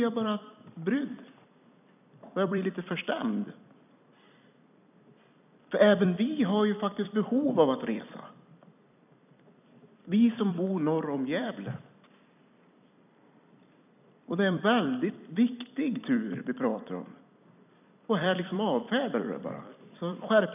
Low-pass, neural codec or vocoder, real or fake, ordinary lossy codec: 3.6 kHz; vocoder, 44.1 kHz, 128 mel bands every 256 samples, BigVGAN v2; fake; none